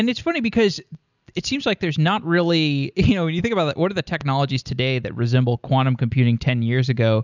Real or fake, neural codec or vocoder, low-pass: real; none; 7.2 kHz